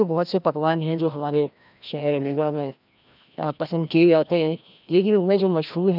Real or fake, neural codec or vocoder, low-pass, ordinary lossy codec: fake; codec, 16 kHz, 1 kbps, FreqCodec, larger model; 5.4 kHz; none